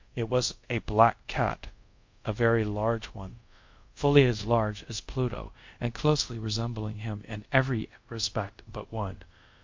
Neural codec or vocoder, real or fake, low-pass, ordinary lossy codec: codec, 24 kHz, 0.5 kbps, DualCodec; fake; 7.2 kHz; MP3, 48 kbps